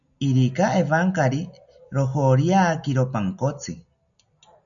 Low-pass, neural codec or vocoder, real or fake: 7.2 kHz; none; real